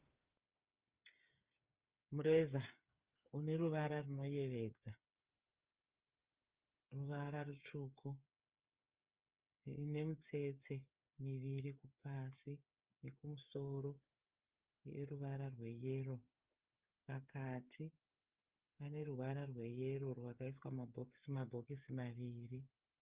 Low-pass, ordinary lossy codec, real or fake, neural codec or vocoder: 3.6 kHz; Opus, 32 kbps; fake; codec, 16 kHz, 8 kbps, FreqCodec, smaller model